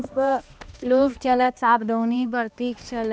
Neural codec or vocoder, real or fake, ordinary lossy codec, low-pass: codec, 16 kHz, 1 kbps, X-Codec, HuBERT features, trained on balanced general audio; fake; none; none